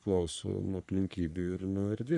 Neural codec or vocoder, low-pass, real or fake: codec, 44.1 kHz, 3.4 kbps, Pupu-Codec; 10.8 kHz; fake